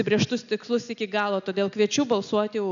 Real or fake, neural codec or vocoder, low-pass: real; none; 7.2 kHz